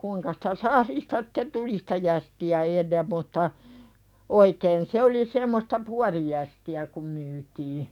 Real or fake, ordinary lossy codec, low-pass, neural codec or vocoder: fake; none; 19.8 kHz; codec, 44.1 kHz, 7.8 kbps, DAC